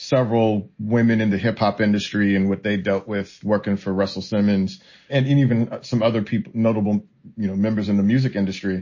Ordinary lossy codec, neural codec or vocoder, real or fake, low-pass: MP3, 32 kbps; none; real; 7.2 kHz